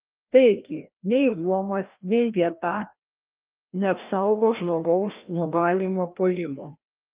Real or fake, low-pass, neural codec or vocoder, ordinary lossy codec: fake; 3.6 kHz; codec, 16 kHz, 1 kbps, FreqCodec, larger model; Opus, 32 kbps